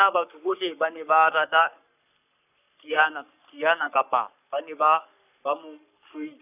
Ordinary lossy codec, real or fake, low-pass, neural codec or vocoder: none; fake; 3.6 kHz; codec, 44.1 kHz, 3.4 kbps, Pupu-Codec